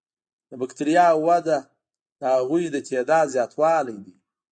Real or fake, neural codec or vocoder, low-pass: real; none; 9.9 kHz